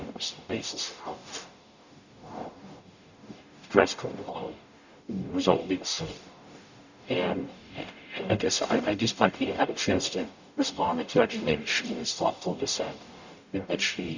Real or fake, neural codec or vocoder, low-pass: fake; codec, 44.1 kHz, 0.9 kbps, DAC; 7.2 kHz